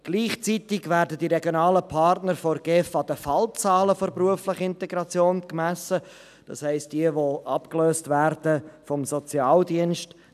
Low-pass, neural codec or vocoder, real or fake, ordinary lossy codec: 14.4 kHz; none; real; none